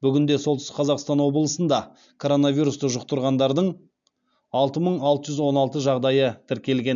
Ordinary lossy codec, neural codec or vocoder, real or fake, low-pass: MP3, 64 kbps; none; real; 7.2 kHz